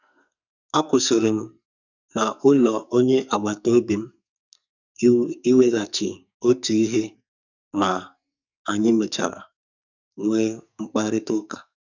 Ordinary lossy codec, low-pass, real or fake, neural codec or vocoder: none; 7.2 kHz; fake; codec, 44.1 kHz, 2.6 kbps, SNAC